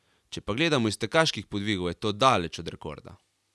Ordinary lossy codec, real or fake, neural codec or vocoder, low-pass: none; real; none; none